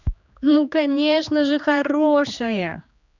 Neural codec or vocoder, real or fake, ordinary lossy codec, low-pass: codec, 16 kHz, 2 kbps, X-Codec, HuBERT features, trained on general audio; fake; none; 7.2 kHz